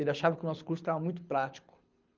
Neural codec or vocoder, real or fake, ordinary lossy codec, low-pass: codec, 24 kHz, 6 kbps, HILCodec; fake; Opus, 24 kbps; 7.2 kHz